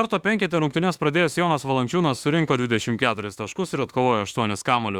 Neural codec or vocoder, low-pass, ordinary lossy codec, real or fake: autoencoder, 48 kHz, 32 numbers a frame, DAC-VAE, trained on Japanese speech; 19.8 kHz; Opus, 64 kbps; fake